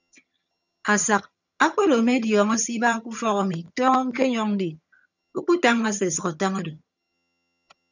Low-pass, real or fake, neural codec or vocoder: 7.2 kHz; fake; vocoder, 22.05 kHz, 80 mel bands, HiFi-GAN